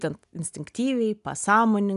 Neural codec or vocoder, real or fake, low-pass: none; real; 10.8 kHz